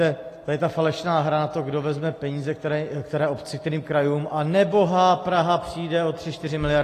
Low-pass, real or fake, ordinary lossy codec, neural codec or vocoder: 14.4 kHz; real; AAC, 48 kbps; none